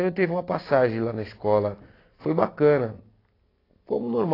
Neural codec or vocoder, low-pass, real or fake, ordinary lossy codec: none; 5.4 kHz; real; AAC, 24 kbps